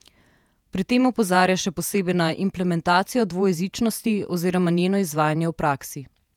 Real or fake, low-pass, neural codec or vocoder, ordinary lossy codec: fake; 19.8 kHz; vocoder, 48 kHz, 128 mel bands, Vocos; none